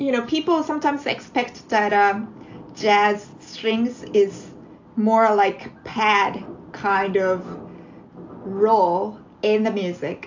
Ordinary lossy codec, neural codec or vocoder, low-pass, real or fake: AAC, 48 kbps; none; 7.2 kHz; real